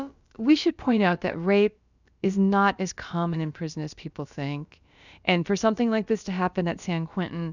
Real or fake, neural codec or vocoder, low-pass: fake; codec, 16 kHz, about 1 kbps, DyCAST, with the encoder's durations; 7.2 kHz